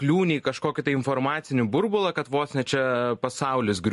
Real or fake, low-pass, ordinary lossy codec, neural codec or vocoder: real; 14.4 kHz; MP3, 48 kbps; none